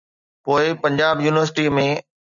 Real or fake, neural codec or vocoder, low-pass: real; none; 7.2 kHz